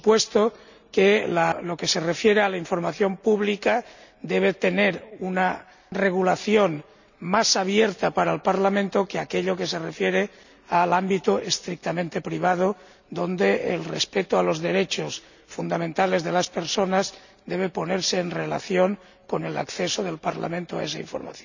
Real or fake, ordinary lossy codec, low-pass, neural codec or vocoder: real; none; 7.2 kHz; none